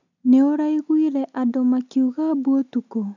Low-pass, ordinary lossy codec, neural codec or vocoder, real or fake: 7.2 kHz; none; none; real